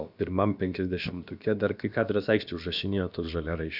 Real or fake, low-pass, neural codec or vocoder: fake; 5.4 kHz; codec, 16 kHz, about 1 kbps, DyCAST, with the encoder's durations